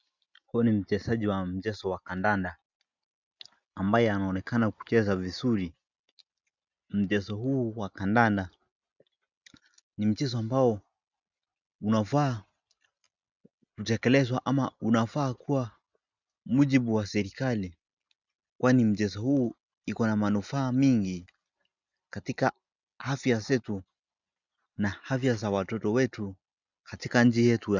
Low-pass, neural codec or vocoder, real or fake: 7.2 kHz; none; real